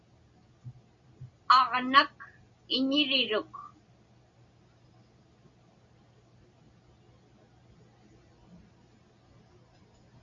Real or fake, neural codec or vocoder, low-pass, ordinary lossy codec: real; none; 7.2 kHz; Opus, 64 kbps